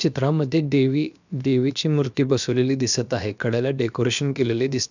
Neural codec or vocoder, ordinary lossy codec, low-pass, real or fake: codec, 16 kHz, about 1 kbps, DyCAST, with the encoder's durations; none; 7.2 kHz; fake